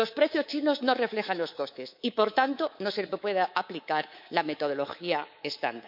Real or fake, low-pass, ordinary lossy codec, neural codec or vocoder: fake; 5.4 kHz; none; codec, 24 kHz, 3.1 kbps, DualCodec